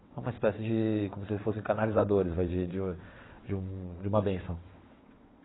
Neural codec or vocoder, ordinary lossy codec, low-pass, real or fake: vocoder, 22.05 kHz, 80 mel bands, WaveNeXt; AAC, 16 kbps; 7.2 kHz; fake